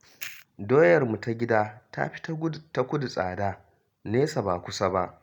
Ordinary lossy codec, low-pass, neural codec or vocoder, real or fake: none; 19.8 kHz; none; real